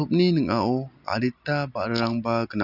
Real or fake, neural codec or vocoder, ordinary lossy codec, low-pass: real; none; none; 5.4 kHz